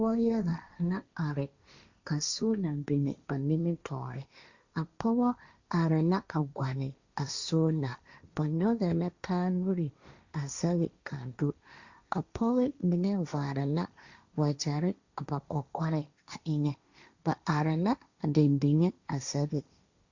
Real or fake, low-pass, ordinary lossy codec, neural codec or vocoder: fake; 7.2 kHz; Opus, 64 kbps; codec, 16 kHz, 1.1 kbps, Voila-Tokenizer